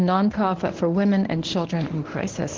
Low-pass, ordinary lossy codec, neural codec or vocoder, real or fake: 7.2 kHz; Opus, 16 kbps; codec, 24 kHz, 0.9 kbps, WavTokenizer, medium speech release version 1; fake